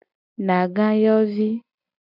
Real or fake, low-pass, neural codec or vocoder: real; 5.4 kHz; none